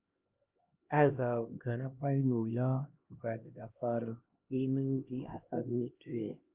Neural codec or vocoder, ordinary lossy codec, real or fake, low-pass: codec, 16 kHz, 1 kbps, X-Codec, HuBERT features, trained on LibriSpeech; Opus, 24 kbps; fake; 3.6 kHz